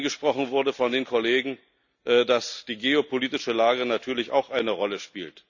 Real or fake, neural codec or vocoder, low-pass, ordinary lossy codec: real; none; 7.2 kHz; none